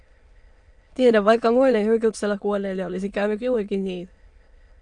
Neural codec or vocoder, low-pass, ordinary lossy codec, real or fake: autoencoder, 22.05 kHz, a latent of 192 numbers a frame, VITS, trained on many speakers; 9.9 kHz; MP3, 64 kbps; fake